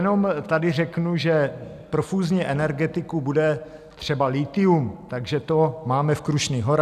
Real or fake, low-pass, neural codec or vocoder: real; 14.4 kHz; none